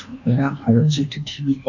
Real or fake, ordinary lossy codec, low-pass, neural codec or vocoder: fake; none; 7.2 kHz; codec, 24 kHz, 1.2 kbps, DualCodec